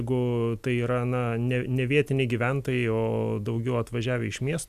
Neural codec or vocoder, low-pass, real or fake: none; 14.4 kHz; real